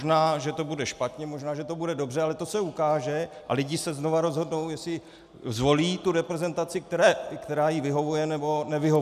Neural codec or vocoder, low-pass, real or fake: none; 14.4 kHz; real